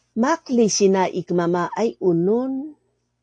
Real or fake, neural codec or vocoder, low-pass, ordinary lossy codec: real; none; 9.9 kHz; AAC, 48 kbps